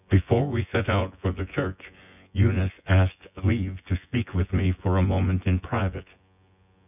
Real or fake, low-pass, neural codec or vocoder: fake; 3.6 kHz; vocoder, 24 kHz, 100 mel bands, Vocos